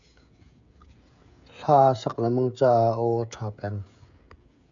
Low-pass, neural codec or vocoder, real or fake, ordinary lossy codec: 7.2 kHz; codec, 16 kHz, 16 kbps, FreqCodec, smaller model; fake; AAC, 64 kbps